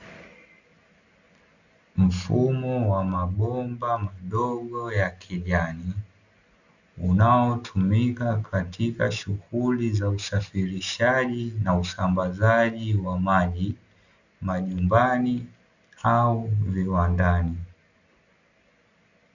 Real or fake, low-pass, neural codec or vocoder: real; 7.2 kHz; none